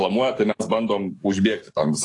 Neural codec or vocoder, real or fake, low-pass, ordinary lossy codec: codec, 44.1 kHz, 7.8 kbps, DAC; fake; 10.8 kHz; AAC, 48 kbps